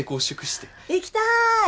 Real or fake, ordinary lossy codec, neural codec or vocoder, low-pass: real; none; none; none